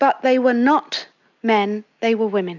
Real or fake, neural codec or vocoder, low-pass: real; none; 7.2 kHz